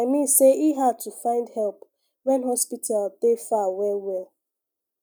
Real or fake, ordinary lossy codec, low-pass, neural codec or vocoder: real; none; none; none